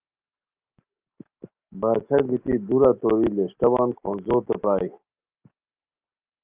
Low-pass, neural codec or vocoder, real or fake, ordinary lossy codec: 3.6 kHz; none; real; Opus, 24 kbps